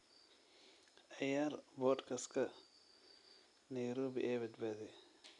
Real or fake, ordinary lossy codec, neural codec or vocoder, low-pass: real; none; none; 10.8 kHz